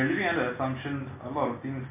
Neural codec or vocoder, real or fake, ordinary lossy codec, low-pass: none; real; MP3, 24 kbps; 3.6 kHz